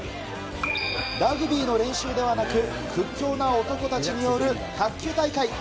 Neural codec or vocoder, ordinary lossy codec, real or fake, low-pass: none; none; real; none